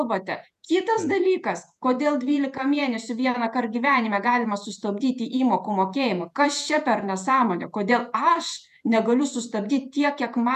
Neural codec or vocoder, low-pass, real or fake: vocoder, 48 kHz, 128 mel bands, Vocos; 14.4 kHz; fake